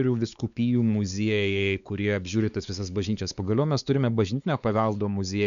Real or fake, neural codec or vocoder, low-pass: fake; codec, 16 kHz, 4 kbps, X-Codec, WavLM features, trained on Multilingual LibriSpeech; 7.2 kHz